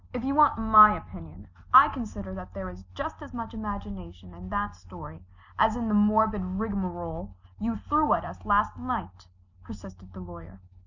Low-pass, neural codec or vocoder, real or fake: 7.2 kHz; none; real